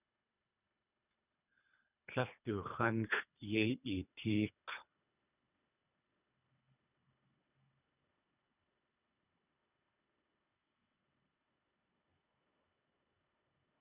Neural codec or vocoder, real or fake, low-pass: codec, 24 kHz, 3 kbps, HILCodec; fake; 3.6 kHz